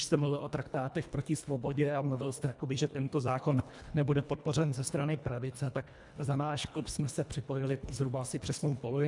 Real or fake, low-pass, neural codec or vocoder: fake; 10.8 kHz; codec, 24 kHz, 1.5 kbps, HILCodec